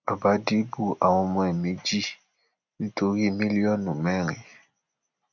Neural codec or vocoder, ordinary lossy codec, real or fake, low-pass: none; none; real; 7.2 kHz